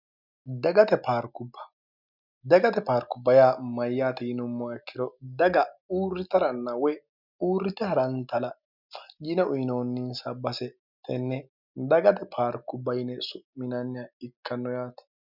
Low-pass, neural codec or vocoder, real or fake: 5.4 kHz; none; real